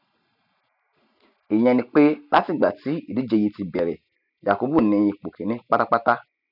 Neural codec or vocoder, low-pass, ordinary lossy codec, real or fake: none; 5.4 kHz; none; real